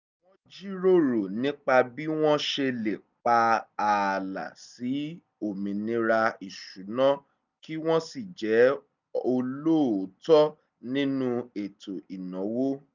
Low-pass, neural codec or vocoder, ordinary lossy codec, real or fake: 7.2 kHz; none; none; real